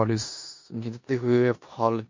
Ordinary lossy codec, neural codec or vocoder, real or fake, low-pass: MP3, 48 kbps; codec, 16 kHz in and 24 kHz out, 0.9 kbps, LongCat-Audio-Codec, four codebook decoder; fake; 7.2 kHz